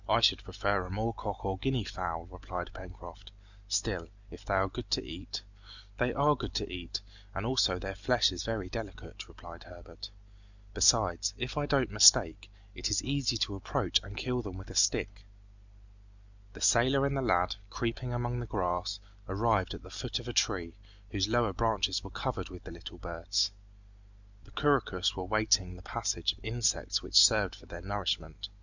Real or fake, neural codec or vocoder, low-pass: real; none; 7.2 kHz